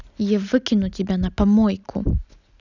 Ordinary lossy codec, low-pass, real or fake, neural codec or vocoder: none; 7.2 kHz; real; none